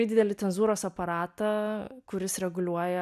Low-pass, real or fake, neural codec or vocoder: 14.4 kHz; real; none